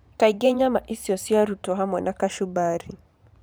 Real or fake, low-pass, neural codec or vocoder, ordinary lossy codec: fake; none; vocoder, 44.1 kHz, 128 mel bands every 512 samples, BigVGAN v2; none